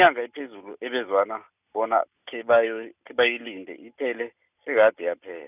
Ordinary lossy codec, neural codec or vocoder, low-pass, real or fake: none; none; 3.6 kHz; real